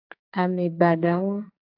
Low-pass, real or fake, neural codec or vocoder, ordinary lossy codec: 5.4 kHz; fake; codec, 44.1 kHz, 2.6 kbps, SNAC; AAC, 32 kbps